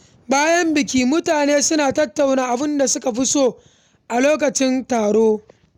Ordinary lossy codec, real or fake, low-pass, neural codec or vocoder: none; real; 19.8 kHz; none